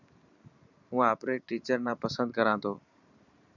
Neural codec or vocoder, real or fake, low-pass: vocoder, 44.1 kHz, 128 mel bands every 512 samples, BigVGAN v2; fake; 7.2 kHz